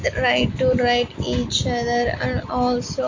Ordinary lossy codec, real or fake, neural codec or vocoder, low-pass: AAC, 48 kbps; real; none; 7.2 kHz